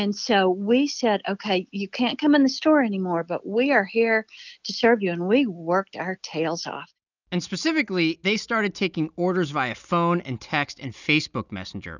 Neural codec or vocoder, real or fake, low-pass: none; real; 7.2 kHz